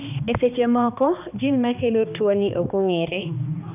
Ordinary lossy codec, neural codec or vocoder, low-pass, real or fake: none; codec, 16 kHz, 2 kbps, X-Codec, HuBERT features, trained on balanced general audio; 3.6 kHz; fake